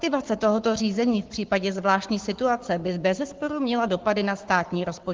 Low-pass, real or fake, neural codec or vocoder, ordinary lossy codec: 7.2 kHz; fake; codec, 44.1 kHz, 7.8 kbps, Pupu-Codec; Opus, 16 kbps